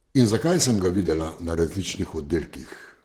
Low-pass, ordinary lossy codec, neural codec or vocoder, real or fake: 19.8 kHz; Opus, 16 kbps; vocoder, 44.1 kHz, 128 mel bands, Pupu-Vocoder; fake